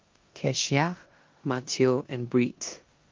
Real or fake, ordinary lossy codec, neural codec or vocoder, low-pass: fake; Opus, 32 kbps; codec, 16 kHz in and 24 kHz out, 0.9 kbps, LongCat-Audio-Codec, four codebook decoder; 7.2 kHz